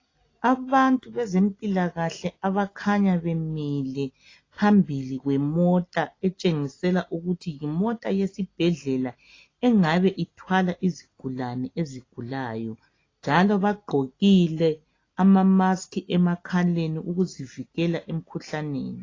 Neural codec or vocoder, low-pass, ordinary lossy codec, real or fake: none; 7.2 kHz; AAC, 32 kbps; real